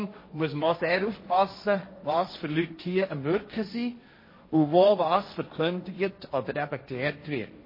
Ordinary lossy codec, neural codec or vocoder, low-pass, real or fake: MP3, 24 kbps; codec, 16 kHz, 1.1 kbps, Voila-Tokenizer; 5.4 kHz; fake